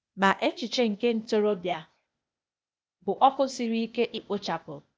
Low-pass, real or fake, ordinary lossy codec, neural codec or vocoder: none; fake; none; codec, 16 kHz, 0.8 kbps, ZipCodec